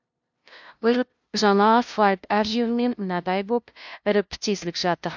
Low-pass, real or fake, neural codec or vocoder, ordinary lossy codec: 7.2 kHz; fake; codec, 16 kHz, 0.5 kbps, FunCodec, trained on LibriTTS, 25 frames a second; MP3, 64 kbps